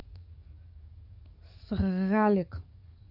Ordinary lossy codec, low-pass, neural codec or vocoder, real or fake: none; 5.4 kHz; codec, 44.1 kHz, 7.8 kbps, DAC; fake